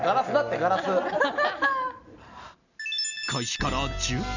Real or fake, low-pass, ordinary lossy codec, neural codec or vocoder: real; 7.2 kHz; none; none